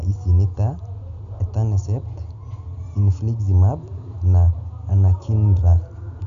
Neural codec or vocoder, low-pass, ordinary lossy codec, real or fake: none; 7.2 kHz; none; real